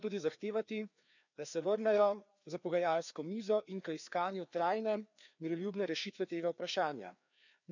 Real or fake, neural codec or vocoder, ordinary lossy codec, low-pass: fake; codec, 16 kHz, 2 kbps, FreqCodec, larger model; none; 7.2 kHz